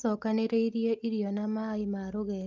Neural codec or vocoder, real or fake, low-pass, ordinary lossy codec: none; real; 7.2 kHz; Opus, 32 kbps